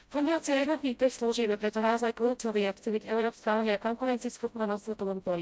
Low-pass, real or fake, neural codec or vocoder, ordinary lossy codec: none; fake; codec, 16 kHz, 0.5 kbps, FreqCodec, smaller model; none